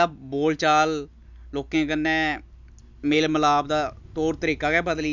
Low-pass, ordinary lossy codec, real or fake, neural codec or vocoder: 7.2 kHz; none; real; none